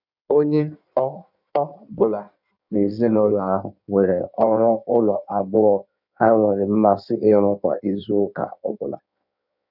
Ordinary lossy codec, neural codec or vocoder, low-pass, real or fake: none; codec, 16 kHz in and 24 kHz out, 1.1 kbps, FireRedTTS-2 codec; 5.4 kHz; fake